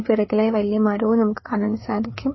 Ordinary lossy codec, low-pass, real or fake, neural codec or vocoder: MP3, 24 kbps; 7.2 kHz; fake; codec, 16 kHz, 4 kbps, FreqCodec, larger model